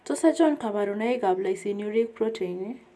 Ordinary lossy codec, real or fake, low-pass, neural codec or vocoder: none; real; none; none